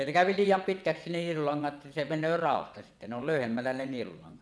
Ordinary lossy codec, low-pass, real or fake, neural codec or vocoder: none; none; fake; vocoder, 22.05 kHz, 80 mel bands, WaveNeXt